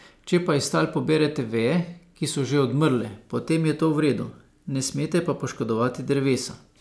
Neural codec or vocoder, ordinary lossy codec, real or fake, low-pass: none; none; real; none